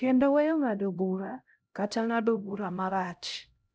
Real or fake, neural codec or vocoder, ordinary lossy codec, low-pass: fake; codec, 16 kHz, 0.5 kbps, X-Codec, HuBERT features, trained on LibriSpeech; none; none